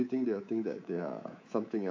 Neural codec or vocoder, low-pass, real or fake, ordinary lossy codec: codec, 24 kHz, 3.1 kbps, DualCodec; 7.2 kHz; fake; AAC, 48 kbps